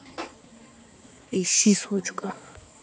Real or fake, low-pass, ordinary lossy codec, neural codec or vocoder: fake; none; none; codec, 16 kHz, 4 kbps, X-Codec, HuBERT features, trained on balanced general audio